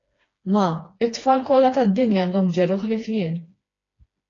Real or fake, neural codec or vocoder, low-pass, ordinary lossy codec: fake; codec, 16 kHz, 2 kbps, FreqCodec, smaller model; 7.2 kHz; AAC, 32 kbps